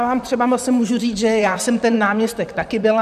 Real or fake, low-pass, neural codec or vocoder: fake; 14.4 kHz; vocoder, 44.1 kHz, 128 mel bands, Pupu-Vocoder